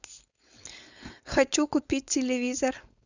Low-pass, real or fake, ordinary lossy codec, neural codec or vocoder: 7.2 kHz; fake; Opus, 64 kbps; codec, 16 kHz, 4.8 kbps, FACodec